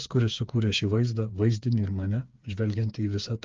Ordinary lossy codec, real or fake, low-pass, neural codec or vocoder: Opus, 24 kbps; fake; 7.2 kHz; codec, 16 kHz, 4 kbps, FreqCodec, smaller model